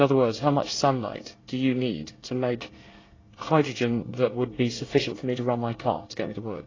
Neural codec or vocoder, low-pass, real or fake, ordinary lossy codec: codec, 24 kHz, 1 kbps, SNAC; 7.2 kHz; fake; AAC, 32 kbps